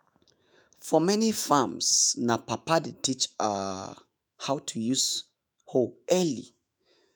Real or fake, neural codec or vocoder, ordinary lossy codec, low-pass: fake; autoencoder, 48 kHz, 128 numbers a frame, DAC-VAE, trained on Japanese speech; none; none